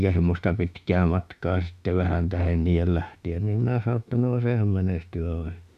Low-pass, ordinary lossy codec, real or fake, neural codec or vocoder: 14.4 kHz; none; fake; autoencoder, 48 kHz, 32 numbers a frame, DAC-VAE, trained on Japanese speech